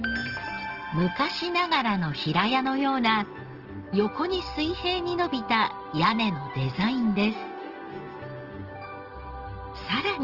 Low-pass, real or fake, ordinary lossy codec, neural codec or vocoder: 5.4 kHz; real; Opus, 16 kbps; none